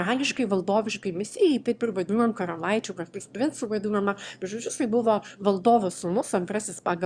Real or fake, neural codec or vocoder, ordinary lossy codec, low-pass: fake; autoencoder, 22.05 kHz, a latent of 192 numbers a frame, VITS, trained on one speaker; Opus, 64 kbps; 9.9 kHz